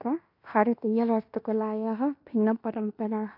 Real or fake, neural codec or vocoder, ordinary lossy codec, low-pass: fake; codec, 16 kHz in and 24 kHz out, 0.9 kbps, LongCat-Audio-Codec, fine tuned four codebook decoder; AAC, 48 kbps; 5.4 kHz